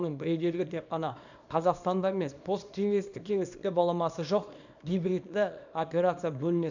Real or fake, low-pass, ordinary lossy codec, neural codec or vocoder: fake; 7.2 kHz; none; codec, 24 kHz, 0.9 kbps, WavTokenizer, small release